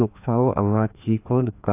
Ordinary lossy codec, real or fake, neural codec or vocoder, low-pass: AAC, 24 kbps; fake; codec, 16 kHz, 2 kbps, FreqCodec, larger model; 3.6 kHz